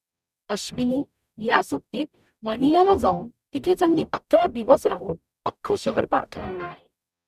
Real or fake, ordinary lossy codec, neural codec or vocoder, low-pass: fake; none; codec, 44.1 kHz, 0.9 kbps, DAC; 14.4 kHz